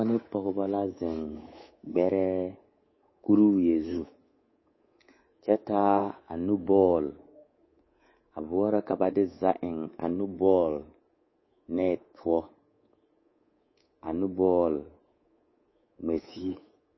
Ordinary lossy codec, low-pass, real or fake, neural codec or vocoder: MP3, 24 kbps; 7.2 kHz; fake; codec, 24 kHz, 3.1 kbps, DualCodec